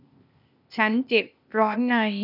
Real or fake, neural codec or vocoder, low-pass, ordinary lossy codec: fake; codec, 16 kHz, 0.8 kbps, ZipCodec; 5.4 kHz; none